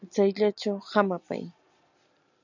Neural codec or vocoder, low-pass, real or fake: none; 7.2 kHz; real